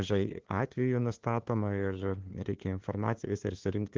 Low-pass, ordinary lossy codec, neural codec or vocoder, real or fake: 7.2 kHz; Opus, 16 kbps; codec, 16 kHz, 2 kbps, FunCodec, trained on LibriTTS, 25 frames a second; fake